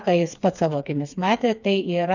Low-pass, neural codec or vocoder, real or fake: 7.2 kHz; codec, 16 kHz, 4 kbps, FreqCodec, smaller model; fake